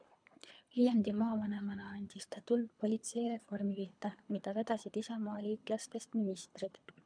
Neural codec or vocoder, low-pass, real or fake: codec, 24 kHz, 3 kbps, HILCodec; 9.9 kHz; fake